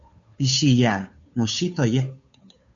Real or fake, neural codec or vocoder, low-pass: fake; codec, 16 kHz, 2 kbps, FunCodec, trained on Chinese and English, 25 frames a second; 7.2 kHz